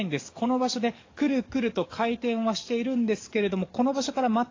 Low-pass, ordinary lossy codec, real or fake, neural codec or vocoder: 7.2 kHz; AAC, 32 kbps; fake; codec, 44.1 kHz, 7.8 kbps, DAC